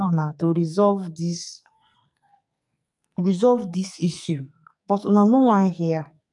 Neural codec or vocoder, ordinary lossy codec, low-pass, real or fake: codec, 44.1 kHz, 2.6 kbps, SNAC; none; 10.8 kHz; fake